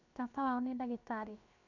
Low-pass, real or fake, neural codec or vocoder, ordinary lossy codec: 7.2 kHz; fake; codec, 16 kHz, 0.7 kbps, FocalCodec; none